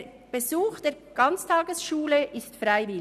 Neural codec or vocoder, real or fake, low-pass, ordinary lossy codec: none; real; 14.4 kHz; none